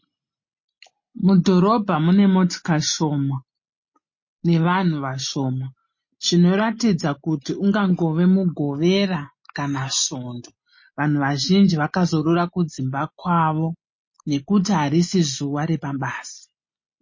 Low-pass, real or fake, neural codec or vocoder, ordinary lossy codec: 7.2 kHz; real; none; MP3, 32 kbps